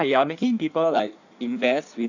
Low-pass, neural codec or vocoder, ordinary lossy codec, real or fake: 7.2 kHz; codec, 16 kHz in and 24 kHz out, 1.1 kbps, FireRedTTS-2 codec; none; fake